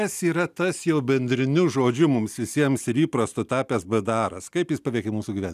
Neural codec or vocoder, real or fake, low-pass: none; real; 14.4 kHz